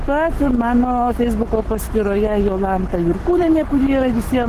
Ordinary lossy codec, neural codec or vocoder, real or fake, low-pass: Opus, 16 kbps; codec, 44.1 kHz, 7.8 kbps, Pupu-Codec; fake; 14.4 kHz